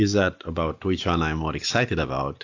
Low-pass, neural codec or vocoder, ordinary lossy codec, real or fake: 7.2 kHz; none; AAC, 48 kbps; real